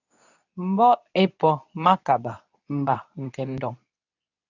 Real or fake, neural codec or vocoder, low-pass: fake; codec, 24 kHz, 0.9 kbps, WavTokenizer, medium speech release version 2; 7.2 kHz